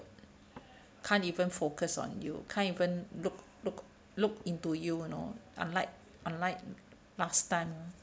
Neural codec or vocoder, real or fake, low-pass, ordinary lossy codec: none; real; none; none